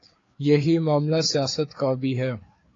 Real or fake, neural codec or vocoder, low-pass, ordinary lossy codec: fake; codec, 16 kHz, 4 kbps, FreqCodec, larger model; 7.2 kHz; AAC, 32 kbps